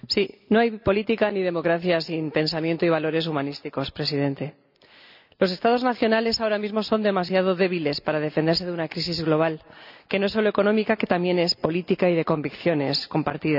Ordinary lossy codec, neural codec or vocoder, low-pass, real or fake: none; none; 5.4 kHz; real